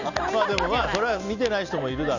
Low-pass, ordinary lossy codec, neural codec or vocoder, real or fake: 7.2 kHz; Opus, 64 kbps; none; real